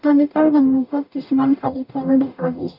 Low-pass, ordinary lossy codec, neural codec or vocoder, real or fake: 5.4 kHz; none; codec, 44.1 kHz, 0.9 kbps, DAC; fake